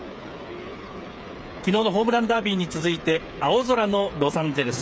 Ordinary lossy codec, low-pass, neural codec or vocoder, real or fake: none; none; codec, 16 kHz, 8 kbps, FreqCodec, smaller model; fake